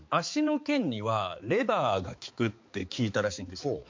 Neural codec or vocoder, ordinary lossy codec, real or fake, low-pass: codec, 16 kHz, 4 kbps, X-Codec, HuBERT features, trained on balanced general audio; MP3, 48 kbps; fake; 7.2 kHz